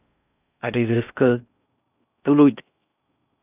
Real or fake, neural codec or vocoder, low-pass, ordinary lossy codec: fake; codec, 16 kHz in and 24 kHz out, 0.6 kbps, FocalCodec, streaming, 4096 codes; 3.6 kHz; none